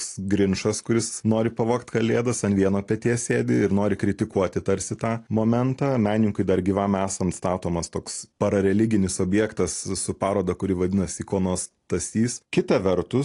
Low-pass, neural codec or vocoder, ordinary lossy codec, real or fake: 10.8 kHz; none; AAC, 48 kbps; real